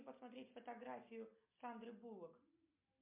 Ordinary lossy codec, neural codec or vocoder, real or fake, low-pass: MP3, 32 kbps; none; real; 3.6 kHz